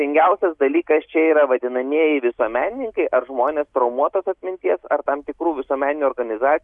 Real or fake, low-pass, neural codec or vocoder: real; 10.8 kHz; none